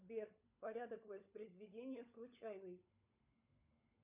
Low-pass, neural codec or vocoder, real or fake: 3.6 kHz; codec, 16 kHz, 16 kbps, FunCodec, trained on LibriTTS, 50 frames a second; fake